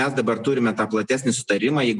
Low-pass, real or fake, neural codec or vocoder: 10.8 kHz; real; none